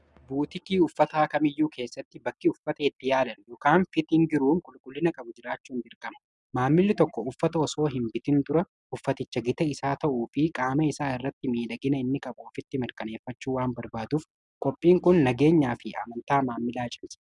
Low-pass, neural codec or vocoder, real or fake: 10.8 kHz; none; real